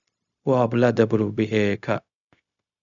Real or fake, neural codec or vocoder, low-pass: fake; codec, 16 kHz, 0.4 kbps, LongCat-Audio-Codec; 7.2 kHz